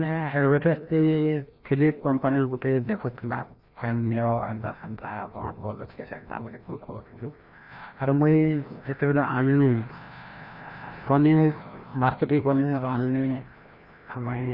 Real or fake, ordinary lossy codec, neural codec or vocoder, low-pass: fake; none; codec, 16 kHz, 1 kbps, FreqCodec, larger model; 5.4 kHz